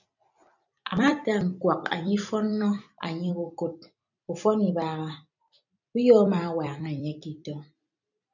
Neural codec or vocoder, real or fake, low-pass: vocoder, 44.1 kHz, 128 mel bands every 256 samples, BigVGAN v2; fake; 7.2 kHz